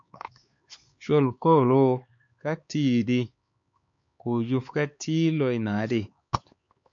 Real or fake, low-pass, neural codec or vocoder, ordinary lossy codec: fake; 7.2 kHz; codec, 16 kHz, 4 kbps, X-Codec, HuBERT features, trained on LibriSpeech; MP3, 48 kbps